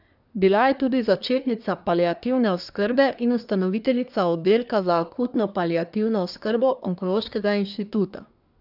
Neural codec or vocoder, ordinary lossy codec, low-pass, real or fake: codec, 24 kHz, 1 kbps, SNAC; none; 5.4 kHz; fake